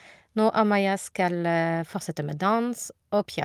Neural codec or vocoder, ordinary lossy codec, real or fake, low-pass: none; Opus, 32 kbps; real; 14.4 kHz